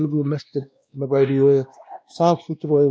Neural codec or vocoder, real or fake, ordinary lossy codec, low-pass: codec, 16 kHz, 1 kbps, X-Codec, WavLM features, trained on Multilingual LibriSpeech; fake; none; none